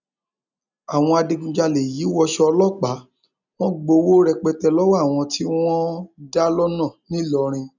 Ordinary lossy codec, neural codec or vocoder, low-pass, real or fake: none; none; 7.2 kHz; real